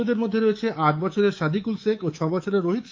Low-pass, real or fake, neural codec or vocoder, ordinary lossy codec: 7.2 kHz; fake; codec, 24 kHz, 3.1 kbps, DualCodec; Opus, 24 kbps